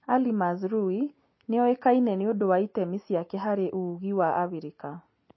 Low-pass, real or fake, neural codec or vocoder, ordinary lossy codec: 7.2 kHz; real; none; MP3, 24 kbps